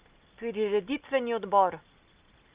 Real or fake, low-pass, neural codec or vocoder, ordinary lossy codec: real; 3.6 kHz; none; Opus, 32 kbps